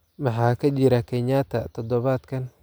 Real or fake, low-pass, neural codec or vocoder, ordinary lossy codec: real; none; none; none